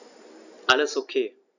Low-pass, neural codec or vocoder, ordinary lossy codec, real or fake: 7.2 kHz; none; none; real